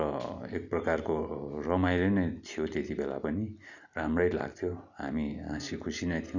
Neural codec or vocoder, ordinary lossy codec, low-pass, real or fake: none; none; 7.2 kHz; real